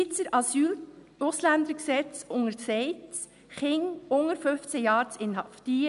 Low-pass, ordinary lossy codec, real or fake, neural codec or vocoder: 10.8 kHz; none; real; none